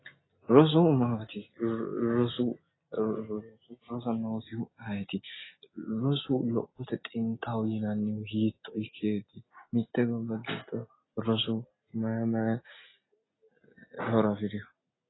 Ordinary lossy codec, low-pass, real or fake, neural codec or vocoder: AAC, 16 kbps; 7.2 kHz; real; none